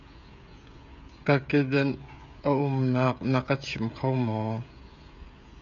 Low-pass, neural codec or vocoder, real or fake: 7.2 kHz; codec, 16 kHz, 16 kbps, FreqCodec, smaller model; fake